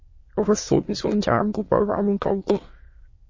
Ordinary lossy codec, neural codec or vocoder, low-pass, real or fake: MP3, 32 kbps; autoencoder, 22.05 kHz, a latent of 192 numbers a frame, VITS, trained on many speakers; 7.2 kHz; fake